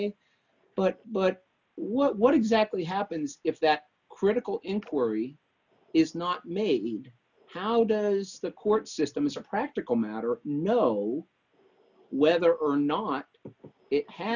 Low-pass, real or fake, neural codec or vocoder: 7.2 kHz; real; none